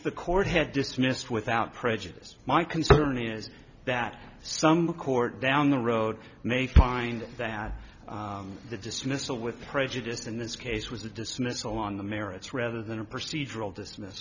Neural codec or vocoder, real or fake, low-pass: none; real; 7.2 kHz